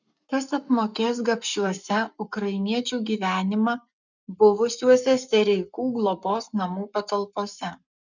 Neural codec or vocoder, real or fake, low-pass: codec, 44.1 kHz, 7.8 kbps, Pupu-Codec; fake; 7.2 kHz